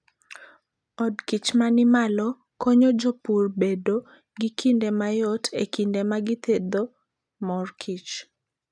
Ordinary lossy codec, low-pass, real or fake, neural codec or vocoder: none; none; real; none